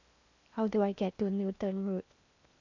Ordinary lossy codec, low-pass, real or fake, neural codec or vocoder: AAC, 48 kbps; 7.2 kHz; fake; codec, 16 kHz in and 24 kHz out, 0.8 kbps, FocalCodec, streaming, 65536 codes